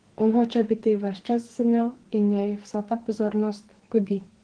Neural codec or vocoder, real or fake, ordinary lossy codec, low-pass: codec, 44.1 kHz, 2.6 kbps, SNAC; fake; Opus, 16 kbps; 9.9 kHz